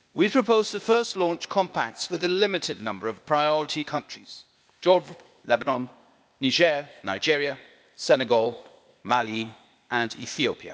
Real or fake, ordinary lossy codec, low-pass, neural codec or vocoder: fake; none; none; codec, 16 kHz, 0.8 kbps, ZipCodec